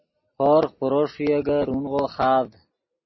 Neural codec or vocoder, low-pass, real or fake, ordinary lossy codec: none; 7.2 kHz; real; MP3, 24 kbps